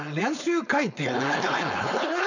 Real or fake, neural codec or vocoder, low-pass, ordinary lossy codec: fake; codec, 16 kHz, 4.8 kbps, FACodec; 7.2 kHz; none